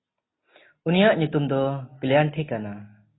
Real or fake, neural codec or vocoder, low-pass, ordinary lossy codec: real; none; 7.2 kHz; AAC, 16 kbps